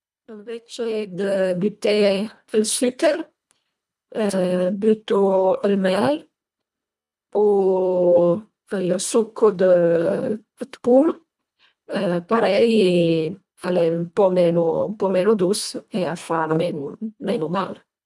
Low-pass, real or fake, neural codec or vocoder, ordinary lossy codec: none; fake; codec, 24 kHz, 1.5 kbps, HILCodec; none